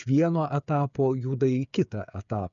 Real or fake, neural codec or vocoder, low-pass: fake; codec, 16 kHz, 8 kbps, FreqCodec, smaller model; 7.2 kHz